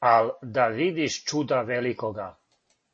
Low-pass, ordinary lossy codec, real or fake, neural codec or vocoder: 9.9 kHz; MP3, 32 kbps; real; none